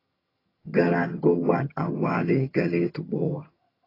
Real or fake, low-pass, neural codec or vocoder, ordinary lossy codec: fake; 5.4 kHz; vocoder, 22.05 kHz, 80 mel bands, HiFi-GAN; AAC, 24 kbps